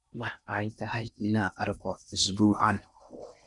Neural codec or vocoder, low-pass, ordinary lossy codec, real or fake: codec, 16 kHz in and 24 kHz out, 0.6 kbps, FocalCodec, streaming, 4096 codes; 10.8 kHz; none; fake